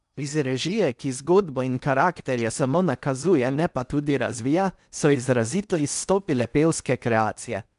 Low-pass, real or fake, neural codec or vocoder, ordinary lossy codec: 10.8 kHz; fake; codec, 16 kHz in and 24 kHz out, 0.8 kbps, FocalCodec, streaming, 65536 codes; none